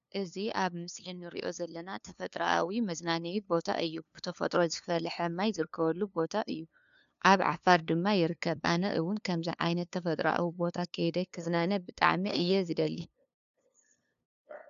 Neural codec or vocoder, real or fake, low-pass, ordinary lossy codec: codec, 16 kHz, 2 kbps, FunCodec, trained on LibriTTS, 25 frames a second; fake; 7.2 kHz; MP3, 96 kbps